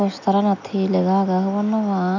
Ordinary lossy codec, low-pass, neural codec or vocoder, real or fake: none; 7.2 kHz; none; real